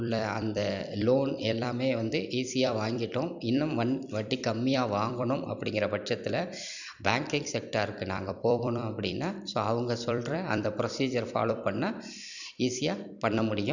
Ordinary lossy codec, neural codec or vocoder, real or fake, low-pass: none; vocoder, 44.1 kHz, 128 mel bands every 256 samples, BigVGAN v2; fake; 7.2 kHz